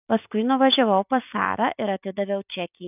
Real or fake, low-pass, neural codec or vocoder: real; 3.6 kHz; none